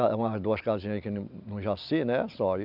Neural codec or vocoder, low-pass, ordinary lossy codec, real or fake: none; 5.4 kHz; none; real